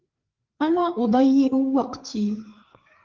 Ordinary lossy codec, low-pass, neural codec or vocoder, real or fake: Opus, 16 kbps; 7.2 kHz; codec, 16 kHz, 4 kbps, FreqCodec, larger model; fake